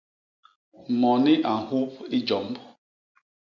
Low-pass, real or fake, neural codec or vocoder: 7.2 kHz; real; none